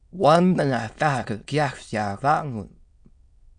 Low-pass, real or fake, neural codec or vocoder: 9.9 kHz; fake; autoencoder, 22.05 kHz, a latent of 192 numbers a frame, VITS, trained on many speakers